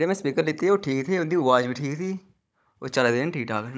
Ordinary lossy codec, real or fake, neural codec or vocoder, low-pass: none; fake; codec, 16 kHz, 16 kbps, FunCodec, trained on Chinese and English, 50 frames a second; none